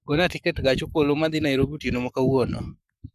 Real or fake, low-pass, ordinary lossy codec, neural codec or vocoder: fake; 14.4 kHz; none; codec, 44.1 kHz, 7.8 kbps, Pupu-Codec